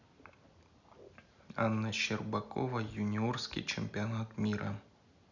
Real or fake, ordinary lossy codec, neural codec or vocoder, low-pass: real; none; none; 7.2 kHz